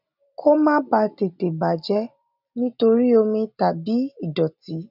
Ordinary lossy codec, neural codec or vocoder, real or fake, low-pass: none; none; real; 5.4 kHz